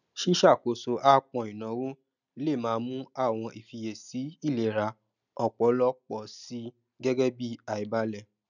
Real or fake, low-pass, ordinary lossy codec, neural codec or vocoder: real; 7.2 kHz; none; none